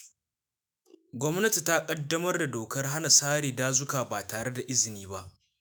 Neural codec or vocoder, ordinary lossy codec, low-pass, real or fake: autoencoder, 48 kHz, 128 numbers a frame, DAC-VAE, trained on Japanese speech; none; none; fake